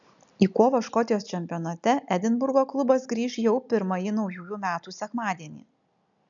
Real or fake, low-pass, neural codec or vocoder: real; 7.2 kHz; none